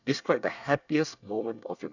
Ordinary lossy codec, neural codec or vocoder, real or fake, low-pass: none; codec, 24 kHz, 1 kbps, SNAC; fake; 7.2 kHz